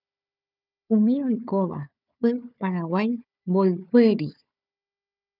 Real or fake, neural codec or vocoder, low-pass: fake; codec, 16 kHz, 4 kbps, FunCodec, trained on Chinese and English, 50 frames a second; 5.4 kHz